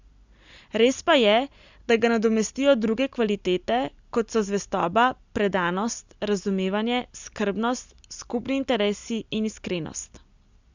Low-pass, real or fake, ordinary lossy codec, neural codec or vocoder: 7.2 kHz; real; Opus, 64 kbps; none